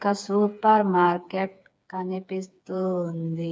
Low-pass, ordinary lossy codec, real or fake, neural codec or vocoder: none; none; fake; codec, 16 kHz, 4 kbps, FreqCodec, smaller model